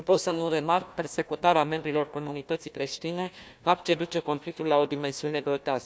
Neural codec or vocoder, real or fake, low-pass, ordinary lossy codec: codec, 16 kHz, 1 kbps, FunCodec, trained on Chinese and English, 50 frames a second; fake; none; none